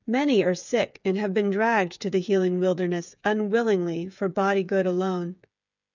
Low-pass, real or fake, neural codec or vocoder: 7.2 kHz; fake; codec, 16 kHz, 8 kbps, FreqCodec, smaller model